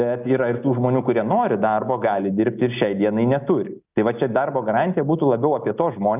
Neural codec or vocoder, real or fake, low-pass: none; real; 3.6 kHz